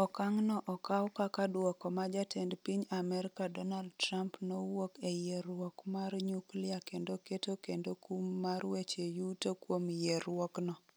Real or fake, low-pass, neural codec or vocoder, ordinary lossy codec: real; none; none; none